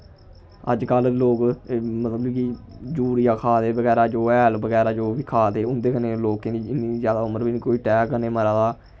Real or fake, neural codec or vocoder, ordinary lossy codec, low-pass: real; none; none; none